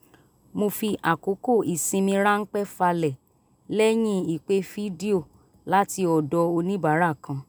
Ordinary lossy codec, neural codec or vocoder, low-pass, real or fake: none; none; none; real